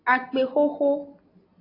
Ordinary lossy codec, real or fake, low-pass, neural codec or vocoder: AAC, 24 kbps; real; 5.4 kHz; none